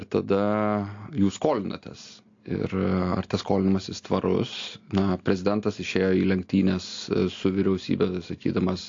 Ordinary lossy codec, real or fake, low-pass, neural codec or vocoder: AAC, 48 kbps; real; 7.2 kHz; none